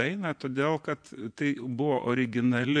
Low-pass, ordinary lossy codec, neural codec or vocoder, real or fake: 9.9 kHz; AAC, 64 kbps; vocoder, 24 kHz, 100 mel bands, Vocos; fake